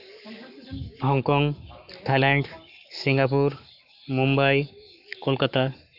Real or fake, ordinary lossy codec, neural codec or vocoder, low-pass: fake; none; codec, 44.1 kHz, 7.8 kbps, Pupu-Codec; 5.4 kHz